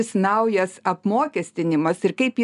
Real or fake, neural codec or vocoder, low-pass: real; none; 10.8 kHz